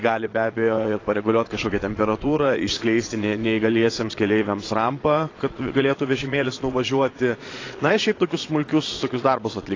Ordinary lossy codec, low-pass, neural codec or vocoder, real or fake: AAC, 32 kbps; 7.2 kHz; vocoder, 22.05 kHz, 80 mel bands, Vocos; fake